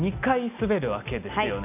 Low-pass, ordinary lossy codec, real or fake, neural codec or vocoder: 3.6 kHz; none; real; none